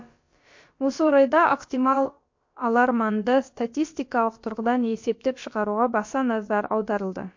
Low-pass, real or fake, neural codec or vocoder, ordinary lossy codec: 7.2 kHz; fake; codec, 16 kHz, about 1 kbps, DyCAST, with the encoder's durations; MP3, 48 kbps